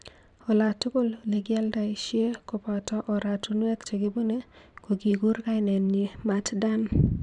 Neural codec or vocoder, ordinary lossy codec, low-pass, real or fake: vocoder, 44.1 kHz, 128 mel bands every 512 samples, BigVGAN v2; none; 10.8 kHz; fake